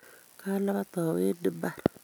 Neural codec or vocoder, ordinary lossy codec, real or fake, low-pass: none; none; real; none